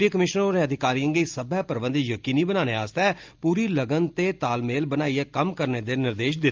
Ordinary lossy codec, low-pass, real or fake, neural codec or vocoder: Opus, 24 kbps; 7.2 kHz; real; none